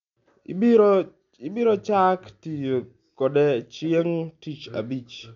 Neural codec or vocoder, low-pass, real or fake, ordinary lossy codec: none; 7.2 kHz; real; MP3, 64 kbps